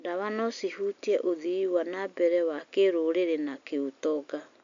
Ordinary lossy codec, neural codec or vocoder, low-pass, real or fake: MP3, 64 kbps; none; 7.2 kHz; real